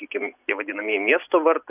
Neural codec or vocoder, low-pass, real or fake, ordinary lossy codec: none; 3.6 kHz; real; AAC, 32 kbps